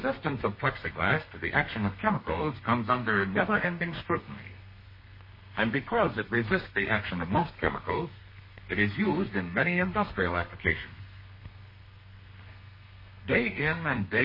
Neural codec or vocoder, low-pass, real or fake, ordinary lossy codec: codec, 32 kHz, 1.9 kbps, SNAC; 5.4 kHz; fake; MP3, 24 kbps